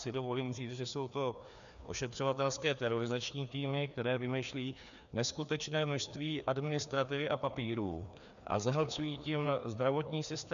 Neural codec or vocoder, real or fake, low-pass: codec, 16 kHz, 2 kbps, FreqCodec, larger model; fake; 7.2 kHz